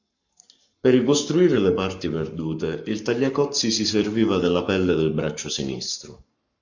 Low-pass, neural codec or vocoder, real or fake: 7.2 kHz; codec, 44.1 kHz, 7.8 kbps, Pupu-Codec; fake